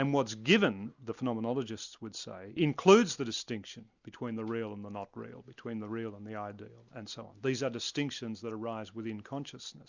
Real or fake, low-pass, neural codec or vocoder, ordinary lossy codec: real; 7.2 kHz; none; Opus, 64 kbps